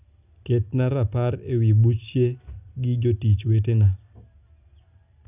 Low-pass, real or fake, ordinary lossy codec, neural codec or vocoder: 3.6 kHz; real; none; none